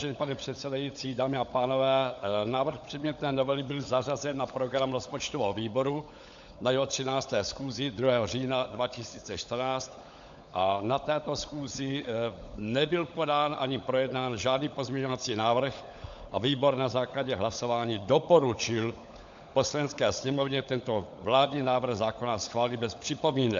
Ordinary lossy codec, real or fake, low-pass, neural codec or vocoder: AAC, 64 kbps; fake; 7.2 kHz; codec, 16 kHz, 16 kbps, FunCodec, trained on LibriTTS, 50 frames a second